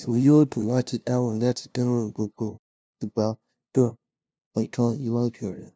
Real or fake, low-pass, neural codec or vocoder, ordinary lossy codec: fake; none; codec, 16 kHz, 0.5 kbps, FunCodec, trained on LibriTTS, 25 frames a second; none